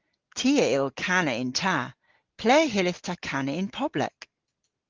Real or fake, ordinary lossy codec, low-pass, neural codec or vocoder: real; Opus, 16 kbps; 7.2 kHz; none